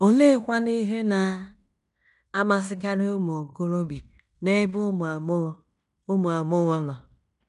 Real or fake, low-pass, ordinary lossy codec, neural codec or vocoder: fake; 10.8 kHz; none; codec, 16 kHz in and 24 kHz out, 0.9 kbps, LongCat-Audio-Codec, fine tuned four codebook decoder